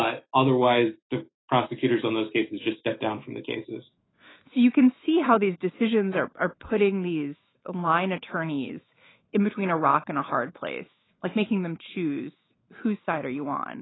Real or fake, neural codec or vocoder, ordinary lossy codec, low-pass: fake; autoencoder, 48 kHz, 128 numbers a frame, DAC-VAE, trained on Japanese speech; AAC, 16 kbps; 7.2 kHz